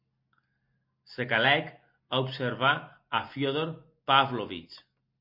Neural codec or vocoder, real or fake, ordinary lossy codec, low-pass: none; real; MP3, 32 kbps; 5.4 kHz